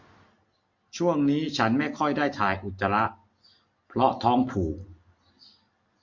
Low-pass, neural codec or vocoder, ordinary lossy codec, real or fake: 7.2 kHz; none; MP3, 48 kbps; real